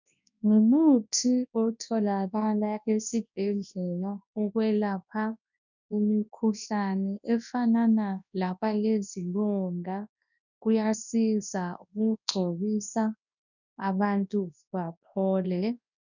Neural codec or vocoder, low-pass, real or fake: codec, 24 kHz, 0.9 kbps, WavTokenizer, large speech release; 7.2 kHz; fake